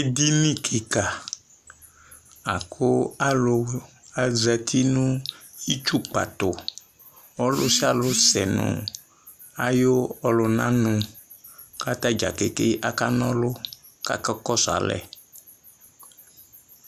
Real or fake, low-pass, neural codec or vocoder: real; 14.4 kHz; none